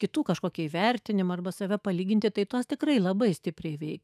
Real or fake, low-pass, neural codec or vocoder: fake; 14.4 kHz; autoencoder, 48 kHz, 128 numbers a frame, DAC-VAE, trained on Japanese speech